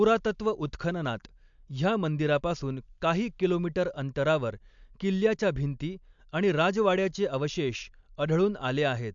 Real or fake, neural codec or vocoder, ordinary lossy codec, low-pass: real; none; MP3, 64 kbps; 7.2 kHz